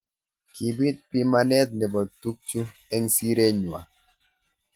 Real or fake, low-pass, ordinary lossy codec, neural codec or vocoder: real; 19.8 kHz; Opus, 24 kbps; none